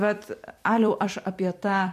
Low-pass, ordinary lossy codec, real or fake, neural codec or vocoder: 14.4 kHz; MP3, 64 kbps; fake; vocoder, 44.1 kHz, 128 mel bands every 256 samples, BigVGAN v2